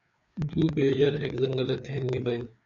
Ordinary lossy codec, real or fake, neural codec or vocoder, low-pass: MP3, 96 kbps; fake; codec, 16 kHz, 4 kbps, FreqCodec, larger model; 7.2 kHz